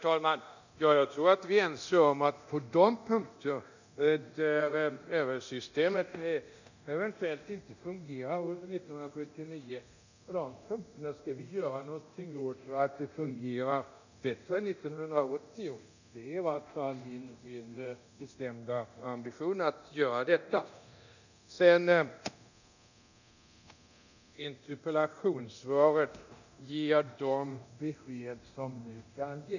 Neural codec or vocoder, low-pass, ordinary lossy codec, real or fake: codec, 24 kHz, 0.9 kbps, DualCodec; 7.2 kHz; none; fake